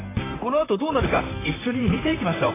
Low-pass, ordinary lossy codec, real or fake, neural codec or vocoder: 3.6 kHz; AAC, 16 kbps; fake; vocoder, 44.1 kHz, 128 mel bands, Pupu-Vocoder